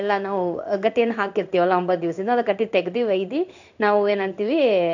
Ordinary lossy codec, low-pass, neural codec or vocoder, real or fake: none; 7.2 kHz; codec, 16 kHz in and 24 kHz out, 1 kbps, XY-Tokenizer; fake